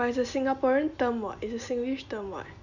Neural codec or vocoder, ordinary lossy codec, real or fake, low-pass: none; none; real; 7.2 kHz